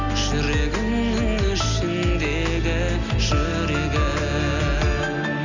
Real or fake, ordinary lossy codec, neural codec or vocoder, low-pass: real; none; none; 7.2 kHz